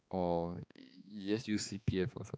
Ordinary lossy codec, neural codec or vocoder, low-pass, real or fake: none; codec, 16 kHz, 2 kbps, X-Codec, HuBERT features, trained on balanced general audio; none; fake